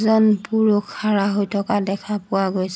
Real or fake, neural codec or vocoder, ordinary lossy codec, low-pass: real; none; none; none